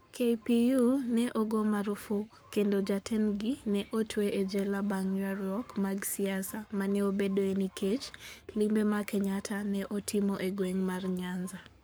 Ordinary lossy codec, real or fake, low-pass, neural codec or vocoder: none; fake; none; codec, 44.1 kHz, 7.8 kbps, Pupu-Codec